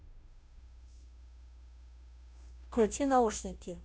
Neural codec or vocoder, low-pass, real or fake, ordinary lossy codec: codec, 16 kHz, 0.5 kbps, FunCodec, trained on Chinese and English, 25 frames a second; none; fake; none